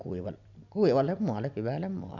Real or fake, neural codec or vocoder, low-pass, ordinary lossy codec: real; none; 7.2 kHz; none